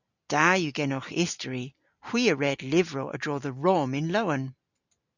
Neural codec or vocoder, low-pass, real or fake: none; 7.2 kHz; real